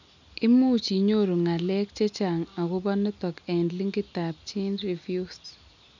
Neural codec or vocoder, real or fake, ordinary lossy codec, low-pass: none; real; none; 7.2 kHz